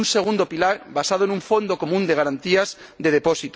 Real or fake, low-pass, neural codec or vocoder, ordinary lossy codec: real; none; none; none